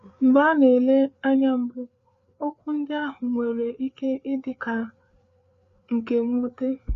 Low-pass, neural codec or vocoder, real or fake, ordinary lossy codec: 7.2 kHz; codec, 16 kHz, 4 kbps, FreqCodec, larger model; fake; Opus, 64 kbps